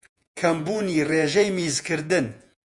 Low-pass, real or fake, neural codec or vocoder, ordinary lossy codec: 10.8 kHz; fake; vocoder, 48 kHz, 128 mel bands, Vocos; MP3, 96 kbps